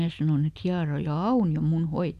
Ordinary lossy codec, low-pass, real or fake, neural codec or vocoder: none; 14.4 kHz; real; none